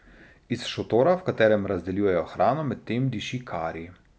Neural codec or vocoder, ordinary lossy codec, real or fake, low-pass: none; none; real; none